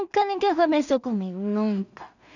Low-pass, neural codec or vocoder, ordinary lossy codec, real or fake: 7.2 kHz; codec, 16 kHz in and 24 kHz out, 0.4 kbps, LongCat-Audio-Codec, two codebook decoder; none; fake